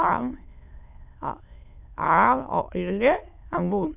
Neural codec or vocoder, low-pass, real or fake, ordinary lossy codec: autoencoder, 22.05 kHz, a latent of 192 numbers a frame, VITS, trained on many speakers; 3.6 kHz; fake; none